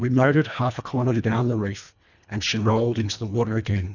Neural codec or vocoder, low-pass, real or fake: codec, 24 kHz, 1.5 kbps, HILCodec; 7.2 kHz; fake